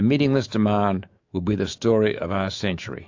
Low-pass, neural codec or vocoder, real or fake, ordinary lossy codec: 7.2 kHz; autoencoder, 48 kHz, 128 numbers a frame, DAC-VAE, trained on Japanese speech; fake; AAC, 48 kbps